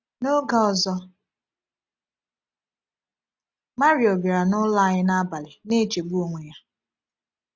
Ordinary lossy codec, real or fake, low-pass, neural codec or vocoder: none; real; none; none